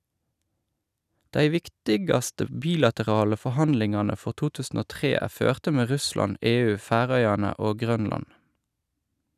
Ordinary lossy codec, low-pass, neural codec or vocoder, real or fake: none; 14.4 kHz; none; real